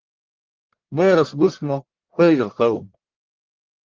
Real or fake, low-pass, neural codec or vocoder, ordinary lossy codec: fake; 7.2 kHz; codec, 44.1 kHz, 1.7 kbps, Pupu-Codec; Opus, 16 kbps